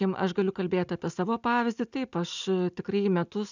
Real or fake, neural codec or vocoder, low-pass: real; none; 7.2 kHz